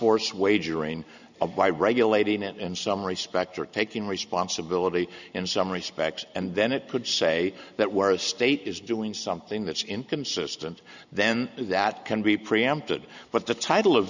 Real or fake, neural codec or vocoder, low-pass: real; none; 7.2 kHz